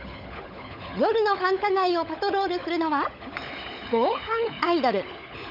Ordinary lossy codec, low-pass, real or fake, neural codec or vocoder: none; 5.4 kHz; fake; codec, 16 kHz, 16 kbps, FunCodec, trained on LibriTTS, 50 frames a second